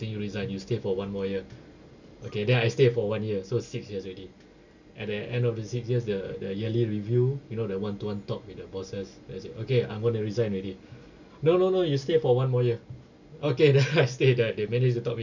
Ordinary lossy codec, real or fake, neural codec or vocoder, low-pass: none; real; none; 7.2 kHz